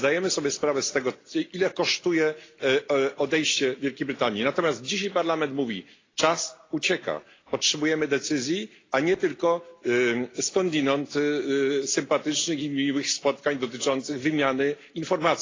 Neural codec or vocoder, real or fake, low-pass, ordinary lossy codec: none; real; 7.2 kHz; AAC, 32 kbps